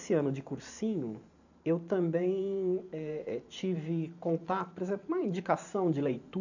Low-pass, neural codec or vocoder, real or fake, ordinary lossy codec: 7.2 kHz; vocoder, 44.1 kHz, 128 mel bands every 512 samples, BigVGAN v2; fake; none